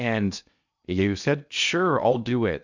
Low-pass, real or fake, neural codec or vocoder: 7.2 kHz; fake; codec, 16 kHz in and 24 kHz out, 0.6 kbps, FocalCodec, streaming, 2048 codes